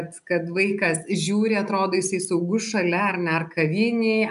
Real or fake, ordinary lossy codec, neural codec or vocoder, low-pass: real; MP3, 96 kbps; none; 10.8 kHz